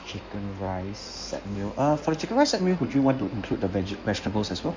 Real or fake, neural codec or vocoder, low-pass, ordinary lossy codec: fake; codec, 16 kHz in and 24 kHz out, 2.2 kbps, FireRedTTS-2 codec; 7.2 kHz; MP3, 64 kbps